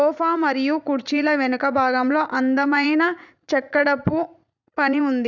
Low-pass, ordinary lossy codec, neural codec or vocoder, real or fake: 7.2 kHz; none; vocoder, 44.1 kHz, 80 mel bands, Vocos; fake